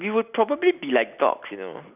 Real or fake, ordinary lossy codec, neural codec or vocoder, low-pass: real; none; none; 3.6 kHz